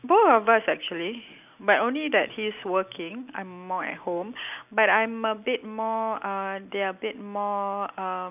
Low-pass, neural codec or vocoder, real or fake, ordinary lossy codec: 3.6 kHz; none; real; none